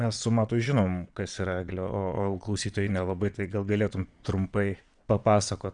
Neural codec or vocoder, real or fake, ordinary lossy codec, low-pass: vocoder, 22.05 kHz, 80 mel bands, Vocos; fake; MP3, 96 kbps; 9.9 kHz